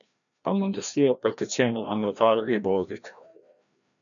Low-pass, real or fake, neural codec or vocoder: 7.2 kHz; fake; codec, 16 kHz, 1 kbps, FreqCodec, larger model